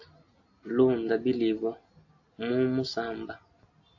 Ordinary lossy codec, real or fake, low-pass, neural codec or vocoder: AAC, 48 kbps; real; 7.2 kHz; none